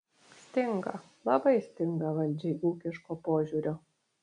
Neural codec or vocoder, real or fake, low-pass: none; real; 9.9 kHz